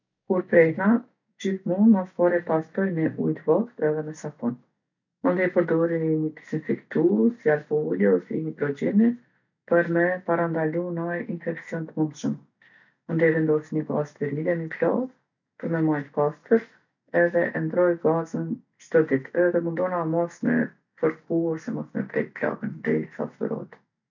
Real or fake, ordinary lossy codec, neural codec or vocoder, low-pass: real; none; none; 7.2 kHz